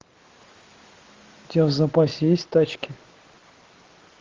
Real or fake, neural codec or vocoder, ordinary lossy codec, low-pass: real; none; Opus, 32 kbps; 7.2 kHz